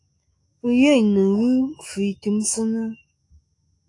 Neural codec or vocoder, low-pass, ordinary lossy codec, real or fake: autoencoder, 48 kHz, 128 numbers a frame, DAC-VAE, trained on Japanese speech; 10.8 kHz; AAC, 48 kbps; fake